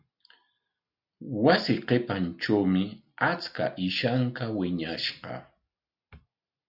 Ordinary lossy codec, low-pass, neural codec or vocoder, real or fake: Opus, 64 kbps; 5.4 kHz; none; real